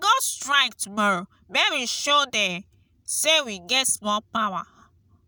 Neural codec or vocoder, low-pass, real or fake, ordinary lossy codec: none; none; real; none